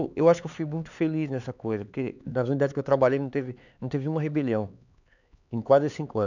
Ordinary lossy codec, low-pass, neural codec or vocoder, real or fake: none; 7.2 kHz; codec, 16 kHz, 4 kbps, X-Codec, HuBERT features, trained on LibriSpeech; fake